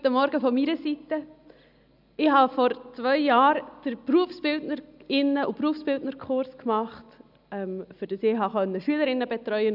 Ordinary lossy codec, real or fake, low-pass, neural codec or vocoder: none; real; 5.4 kHz; none